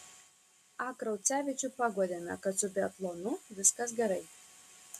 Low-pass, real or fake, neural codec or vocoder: 14.4 kHz; real; none